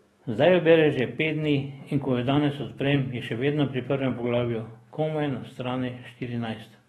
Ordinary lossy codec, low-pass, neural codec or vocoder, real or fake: AAC, 32 kbps; 19.8 kHz; autoencoder, 48 kHz, 128 numbers a frame, DAC-VAE, trained on Japanese speech; fake